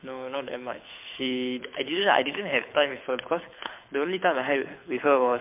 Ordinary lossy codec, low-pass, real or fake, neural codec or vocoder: MP3, 32 kbps; 3.6 kHz; fake; codec, 24 kHz, 6 kbps, HILCodec